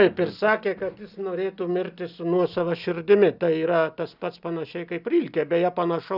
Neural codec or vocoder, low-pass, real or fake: none; 5.4 kHz; real